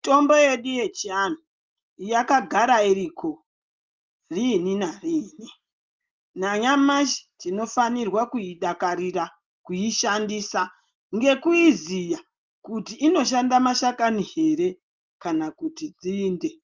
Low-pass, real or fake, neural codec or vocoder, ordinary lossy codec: 7.2 kHz; real; none; Opus, 24 kbps